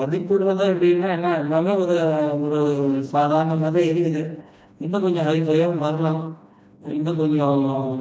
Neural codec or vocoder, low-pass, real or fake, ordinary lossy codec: codec, 16 kHz, 1 kbps, FreqCodec, smaller model; none; fake; none